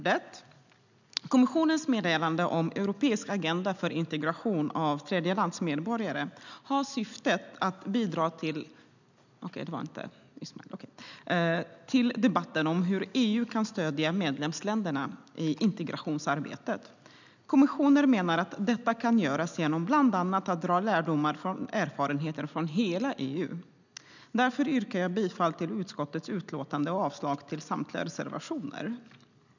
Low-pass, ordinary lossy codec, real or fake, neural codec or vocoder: 7.2 kHz; none; real; none